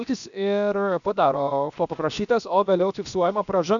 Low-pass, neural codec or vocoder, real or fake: 7.2 kHz; codec, 16 kHz, about 1 kbps, DyCAST, with the encoder's durations; fake